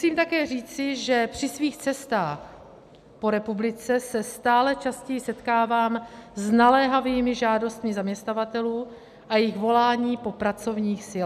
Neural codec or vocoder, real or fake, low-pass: none; real; 14.4 kHz